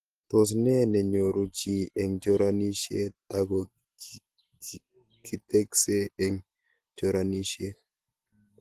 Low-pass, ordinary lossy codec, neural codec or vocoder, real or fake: 14.4 kHz; Opus, 16 kbps; none; real